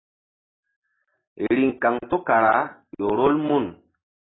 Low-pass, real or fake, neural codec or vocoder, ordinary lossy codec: 7.2 kHz; real; none; AAC, 16 kbps